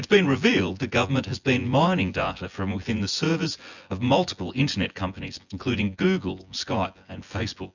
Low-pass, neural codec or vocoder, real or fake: 7.2 kHz; vocoder, 24 kHz, 100 mel bands, Vocos; fake